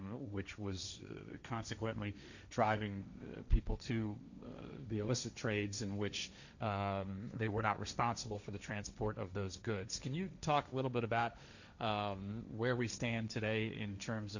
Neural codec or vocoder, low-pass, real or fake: codec, 16 kHz, 1.1 kbps, Voila-Tokenizer; 7.2 kHz; fake